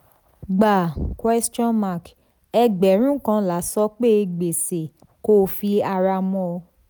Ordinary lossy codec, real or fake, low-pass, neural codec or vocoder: none; real; none; none